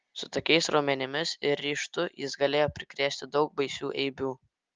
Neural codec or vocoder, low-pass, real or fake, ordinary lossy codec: none; 7.2 kHz; real; Opus, 32 kbps